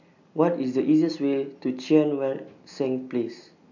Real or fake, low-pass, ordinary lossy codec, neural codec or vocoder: real; 7.2 kHz; none; none